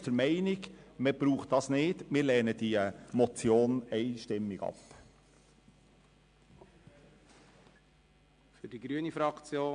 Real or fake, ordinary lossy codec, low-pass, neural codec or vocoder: real; MP3, 64 kbps; 9.9 kHz; none